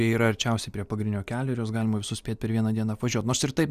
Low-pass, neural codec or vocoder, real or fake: 14.4 kHz; none; real